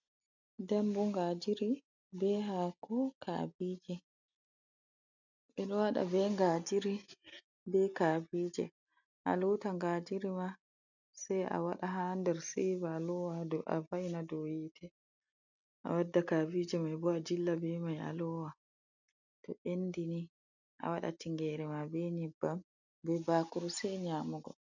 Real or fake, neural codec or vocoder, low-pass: real; none; 7.2 kHz